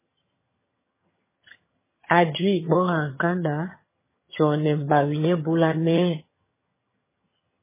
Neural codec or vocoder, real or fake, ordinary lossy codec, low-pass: vocoder, 22.05 kHz, 80 mel bands, HiFi-GAN; fake; MP3, 16 kbps; 3.6 kHz